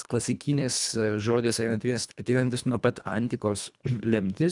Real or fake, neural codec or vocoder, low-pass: fake; codec, 24 kHz, 1.5 kbps, HILCodec; 10.8 kHz